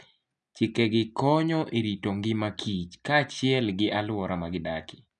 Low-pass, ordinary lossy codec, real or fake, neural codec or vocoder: 9.9 kHz; none; real; none